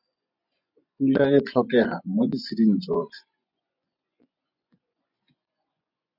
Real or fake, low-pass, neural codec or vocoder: fake; 5.4 kHz; vocoder, 24 kHz, 100 mel bands, Vocos